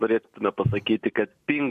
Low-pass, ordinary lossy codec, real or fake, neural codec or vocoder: 14.4 kHz; MP3, 64 kbps; real; none